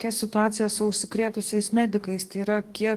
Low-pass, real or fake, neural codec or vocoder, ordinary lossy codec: 14.4 kHz; fake; codec, 44.1 kHz, 2.6 kbps, DAC; Opus, 24 kbps